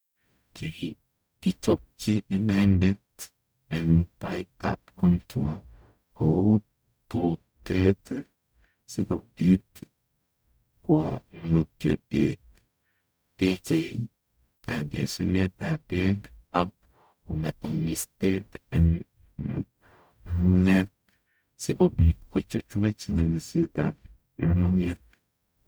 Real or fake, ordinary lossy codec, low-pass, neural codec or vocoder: fake; none; none; codec, 44.1 kHz, 0.9 kbps, DAC